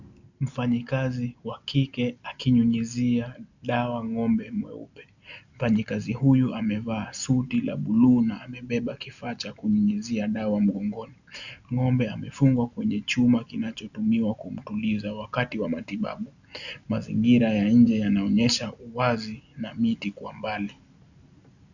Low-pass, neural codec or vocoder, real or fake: 7.2 kHz; none; real